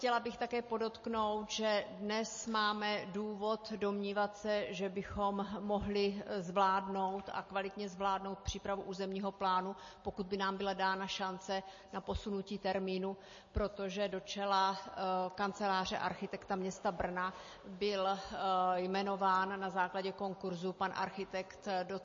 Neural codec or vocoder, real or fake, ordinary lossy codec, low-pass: none; real; MP3, 32 kbps; 7.2 kHz